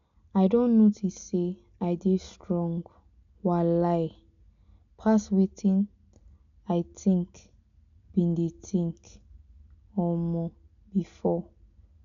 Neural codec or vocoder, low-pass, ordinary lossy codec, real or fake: none; 7.2 kHz; none; real